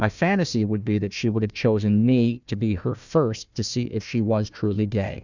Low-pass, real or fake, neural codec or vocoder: 7.2 kHz; fake; codec, 16 kHz, 1 kbps, FunCodec, trained on Chinese and English, 50 frames a second